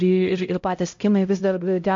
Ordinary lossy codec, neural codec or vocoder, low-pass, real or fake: MP3, 48 kbps; codec, 16 kHz, 0.5 kbps, X-Codec, HuBERT features, trained on LibriSpeech; 7.2 kHz; fake